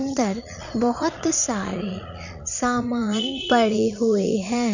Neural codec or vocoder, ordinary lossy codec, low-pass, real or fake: vocoder, 22.05 kHz, 80 mel bands, Vocos; none; 7.2 kHz; fake